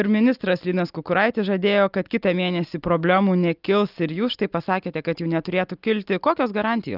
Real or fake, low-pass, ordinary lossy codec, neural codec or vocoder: real; 5.4 kHz; Opus, 24 kbps; none